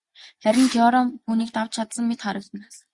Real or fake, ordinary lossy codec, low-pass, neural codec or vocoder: real; Opus, 64 kbps; 10.8 kHz; none